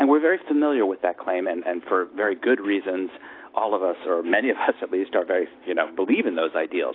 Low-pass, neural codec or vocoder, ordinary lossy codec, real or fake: 5.4 kHz; none; AAC, 32 kbps; real